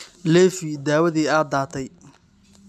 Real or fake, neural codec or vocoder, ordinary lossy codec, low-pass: real; none; none; none